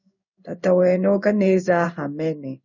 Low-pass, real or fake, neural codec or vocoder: 7.2 kHz; fake; codec, 16 kHz in and 24 kHz out, 1 kbps, XY-Tokenizer